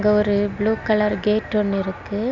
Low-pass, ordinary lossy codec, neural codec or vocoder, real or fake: 7.2 kHz; Opus, 64 kbps; none; real